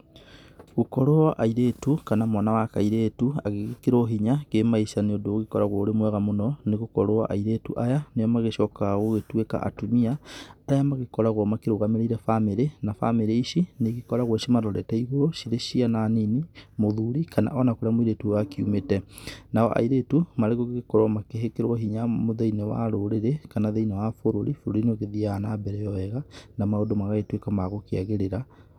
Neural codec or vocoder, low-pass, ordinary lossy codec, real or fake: none; 19.8 kHz; none; real